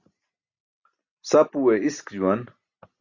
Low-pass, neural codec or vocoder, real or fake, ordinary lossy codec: 7.2 kHz; none; real; Opus, 64 kbps